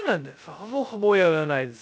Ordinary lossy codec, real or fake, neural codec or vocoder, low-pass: none; fake; codec, 16 kHz, 0.2 kbps, FocalCodec; none